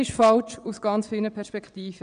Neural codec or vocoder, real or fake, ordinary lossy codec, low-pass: vocoder, 22.05 kHz, 80 mel bands, WaveNeXt; fake; none; 9.9 kHz